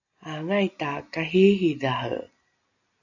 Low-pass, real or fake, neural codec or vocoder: 7.2 kHz; real; none